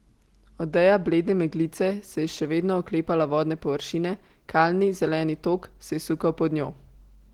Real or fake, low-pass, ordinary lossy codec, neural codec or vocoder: real; 19.8 kHz; Opus, 16 kbps; none